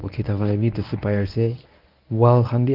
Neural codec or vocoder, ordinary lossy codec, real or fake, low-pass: codec, 24 kHz, 0.9 kbps, WavTokenizer, medium speech release version 1; Opus, 24 kbps; fake; 5.4 kHz